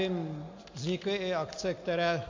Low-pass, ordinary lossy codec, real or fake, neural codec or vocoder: 7.2 kHz; MP3, 48 kbps; real; none